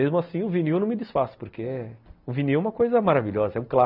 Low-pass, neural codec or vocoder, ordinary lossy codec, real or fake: 5.4 kHz; none; none; real